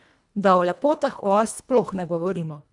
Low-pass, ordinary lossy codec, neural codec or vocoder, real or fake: 10.8 kHz; none; codec, 24 kHz, 1.5 kbps, HILCodec; fake